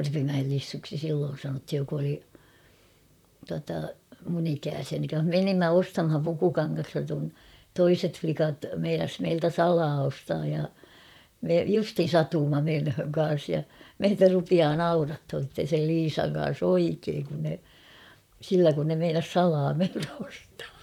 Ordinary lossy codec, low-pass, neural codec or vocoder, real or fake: none; 19.8 kHz; vocoder, 44.1 kHz, 128 mel bands, Pupu-Vocoder; fake